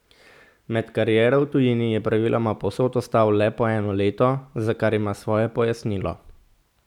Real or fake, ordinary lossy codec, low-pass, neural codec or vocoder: real; none; 19.8 kHz; none